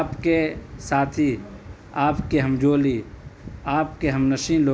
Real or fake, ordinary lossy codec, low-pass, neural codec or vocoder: real; none; none; none